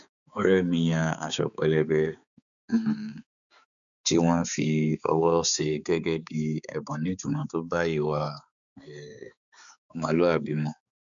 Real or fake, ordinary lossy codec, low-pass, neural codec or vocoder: fake; none; 7.2 kHz; codec, 16 kHz, 4 kbps, X-Codec, HuBERT features, trained on balanced general audio